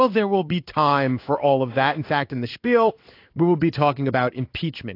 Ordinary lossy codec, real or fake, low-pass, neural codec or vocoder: AAC, 32 kbps; fake; 5.4 kHz; codec, 16 kHz in and 24 kHz out, 1 kbps, XY-Tokenizer